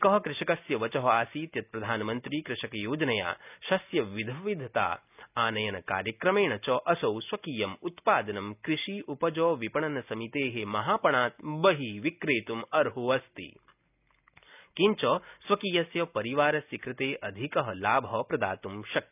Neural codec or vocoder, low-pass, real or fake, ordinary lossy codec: none; 3.6 kHz; real; none